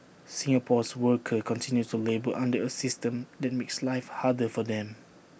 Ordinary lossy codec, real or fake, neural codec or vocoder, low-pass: none; real; none; none